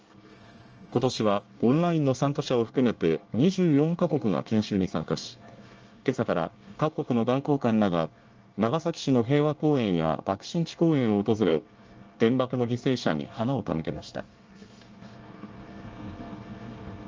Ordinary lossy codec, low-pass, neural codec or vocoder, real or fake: Opus, 24 kbps; 7.2 kHz; codec, 24 kHz, 1 kbps, SNAC; fake